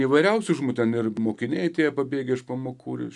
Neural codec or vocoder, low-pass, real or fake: none; 10.8 kHz; real